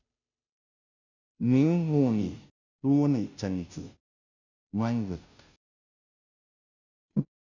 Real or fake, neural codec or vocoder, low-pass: fake; codec, 16 kHz, 0.5 kbps, FunCodec, trained on Chinese and English, 25 frames a second; 7.2 kHz